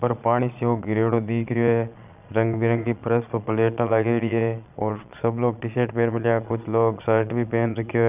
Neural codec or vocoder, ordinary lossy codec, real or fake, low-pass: vocoder, 22.05 kHz, 80 mel bands, Vocos; none; fake; 3.6 kHz